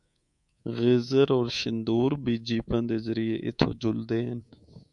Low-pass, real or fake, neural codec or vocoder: 10.8 kHz; fake; codec, 24 kHz, 3.1 kbps, DualCodec